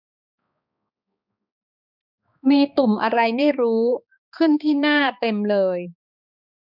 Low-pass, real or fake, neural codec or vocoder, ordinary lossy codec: 5.4 kHz; fake; codec, 16 kHz, 2 kbps, X-Codec, HuBERT features, trained on balanced general audio; none